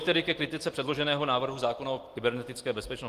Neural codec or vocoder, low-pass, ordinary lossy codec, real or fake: none; 14.4 kHz; Opus, 24 kbps; real